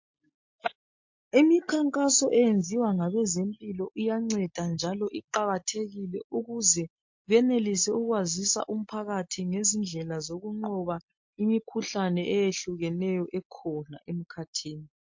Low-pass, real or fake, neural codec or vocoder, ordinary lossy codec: 7.2 kHz; real; none; MP3, 48 kbps